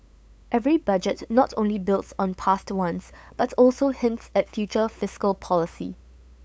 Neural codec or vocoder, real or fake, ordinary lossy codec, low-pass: codec, 16 kHz, 8 kbps, FunCodec, trained on LibriTTS, 25 frames a second; fake; none; none